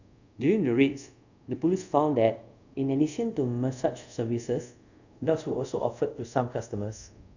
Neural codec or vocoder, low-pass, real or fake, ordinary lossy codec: codec, 24 kHz, 0.5 kbps, DualCodec; 7.2 kHz; fake; none